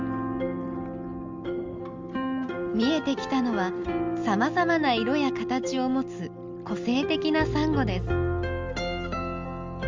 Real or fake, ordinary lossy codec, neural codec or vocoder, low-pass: real; Opus, 32 kbps; none; 7.2 kHz